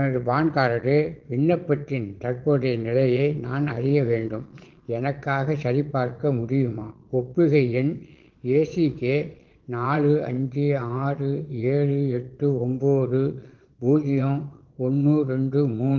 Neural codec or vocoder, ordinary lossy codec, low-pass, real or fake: vocoder, 22.05 kHz, 80 mel bands, Vocos; Opus, 16 kbps; 7.2 kHz; fake